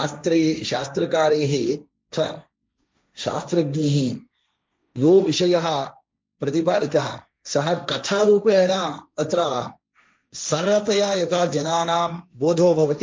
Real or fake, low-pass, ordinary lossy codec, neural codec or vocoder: fake; none; none; codec, 16 kHz, 1.1 kbps, Voila-Tokenizer